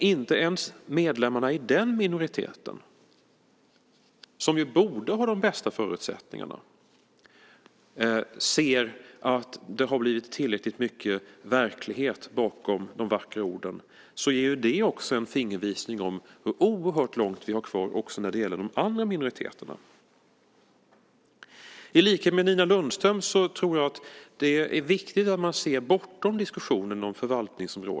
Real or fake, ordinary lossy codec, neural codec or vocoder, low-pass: real; none; none; none